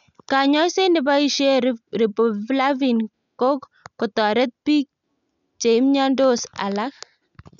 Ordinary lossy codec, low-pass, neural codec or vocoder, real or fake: none; 7.2 kHz; none; real